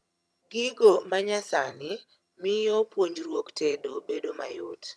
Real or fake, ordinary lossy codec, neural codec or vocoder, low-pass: fake; none; vocoder, 22.05 kHz, 80 mel bands, HiFi-GAN; none